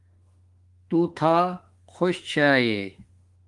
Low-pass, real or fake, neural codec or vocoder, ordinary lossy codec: 10.8 kHz; fake; autoencoder, 48 kHz, 32 numbers a frame, DAC-VAE, trained on Japanese speech; Opus, 24 kbps